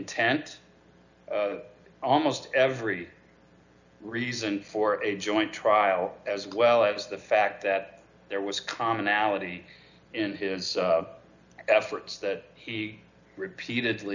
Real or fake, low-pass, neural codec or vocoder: real; 7.2 kHz; none